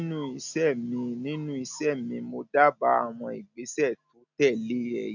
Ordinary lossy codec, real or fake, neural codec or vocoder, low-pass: none; real; none; 7.2 kHz